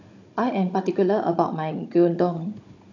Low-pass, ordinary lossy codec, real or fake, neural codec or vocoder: 7.2 kHz; AAC, 48 kbps; fake; codec, 16 kHz, 16 kbps, FunCodec, trained on Chinese and English, 50 frames a second